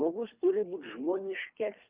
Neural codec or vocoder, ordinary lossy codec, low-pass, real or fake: codec, 24 kHz, 3 kbps, HILCodec; Opus, 24 kbps; 3.6 kHz; fake